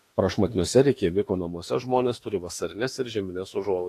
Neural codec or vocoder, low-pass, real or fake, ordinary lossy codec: autoencoder, 48 kHz, 32 numbers a frame, DAC-VAE, trained on Japanese speech; 14.4 kHz; fake; AAC, 48 kbps